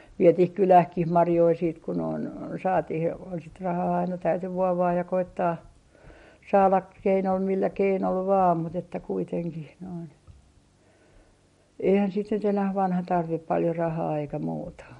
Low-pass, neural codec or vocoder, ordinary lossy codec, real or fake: 19.8 kHz; none; MP3, 48 kbps; real